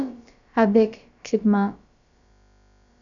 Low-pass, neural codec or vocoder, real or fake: 7.2 kHz; codec, 16 kHz, about 1 kbps, DyCAST, with the encoder's durations; fake